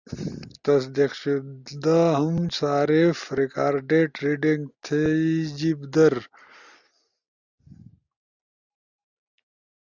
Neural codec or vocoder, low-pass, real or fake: none; 7.2 kHz; real